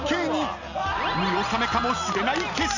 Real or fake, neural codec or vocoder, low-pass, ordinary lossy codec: real; none; 7.2 kHz; none